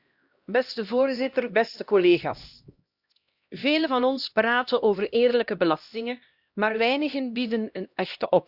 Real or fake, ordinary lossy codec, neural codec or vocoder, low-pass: fake; Opus, 64 kbps; codec, 16 kHz, 1 kbps, X-Codec, HuBERT features, trained on LibriSpeech; 5.4 kHz